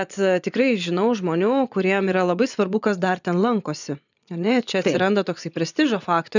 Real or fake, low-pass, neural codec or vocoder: fake; 7.2 kHz; vocoder, 24 kHz, 100 mel bands, Vocos